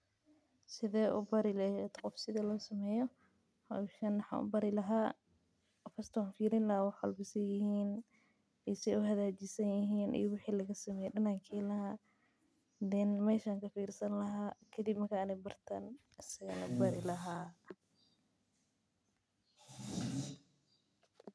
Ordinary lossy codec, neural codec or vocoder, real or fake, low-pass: none; none; real; none